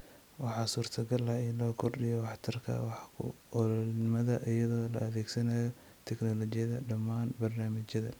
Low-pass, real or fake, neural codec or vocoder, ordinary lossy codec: none; real; none; none